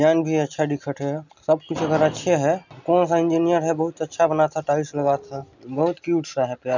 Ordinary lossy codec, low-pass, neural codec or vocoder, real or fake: none; 7.2 kHz; none; real